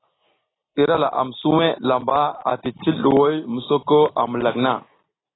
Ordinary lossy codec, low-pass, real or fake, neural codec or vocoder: AAC, 16 kbps; 7.2 kHz; real; none